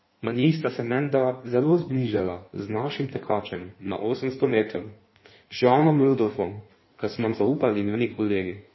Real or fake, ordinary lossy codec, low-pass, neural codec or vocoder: fake; MP3, 24 kbps; 7.2 kHz; codec, 16 kHz in and 24 kHz out, 1.1 kbps, FireRedTTS-2 codec